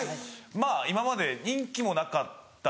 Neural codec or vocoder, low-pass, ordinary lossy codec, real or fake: none; none; none; real